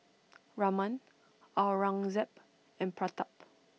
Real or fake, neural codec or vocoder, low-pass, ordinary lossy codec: real; none; none; none